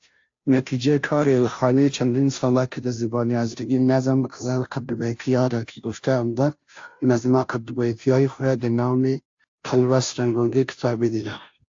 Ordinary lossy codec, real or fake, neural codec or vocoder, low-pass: AAC, 48 kbps; fake; codec, 16 kHz, 0.5 kbps, FunCodec, trained on Chinese and English, 25 frames a second; 7.2 kHz